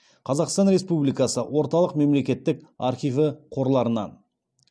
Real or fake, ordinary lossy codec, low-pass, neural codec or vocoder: real; none; none; none